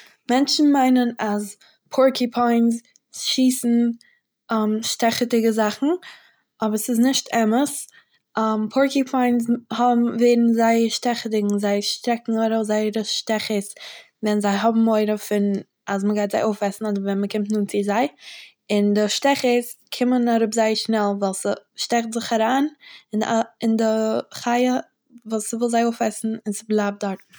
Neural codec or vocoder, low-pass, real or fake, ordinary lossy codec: none; none; real; none